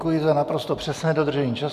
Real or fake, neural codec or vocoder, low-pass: real; none; 14.4 kHz